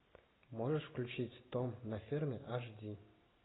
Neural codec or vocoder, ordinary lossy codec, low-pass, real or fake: none; AAC, 16 kbps; 7.2 kHz; real